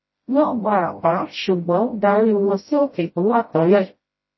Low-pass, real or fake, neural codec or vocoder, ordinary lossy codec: 7.2 kHz; fake; codec, 16 kHz, 0.5 kbps, FreqCodec, smaller model; MP3, 24 kbps